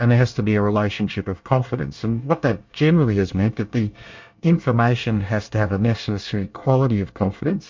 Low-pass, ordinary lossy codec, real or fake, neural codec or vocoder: 7.2 kHz; MP3, 48 kbps; fake; codec, 24 kHz, 1 kbps, SNAC